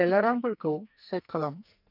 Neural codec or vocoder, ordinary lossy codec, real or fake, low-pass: codec, 44.1 kHz, 2.6 kbps, SNAC; none; fake; 5.4 kHz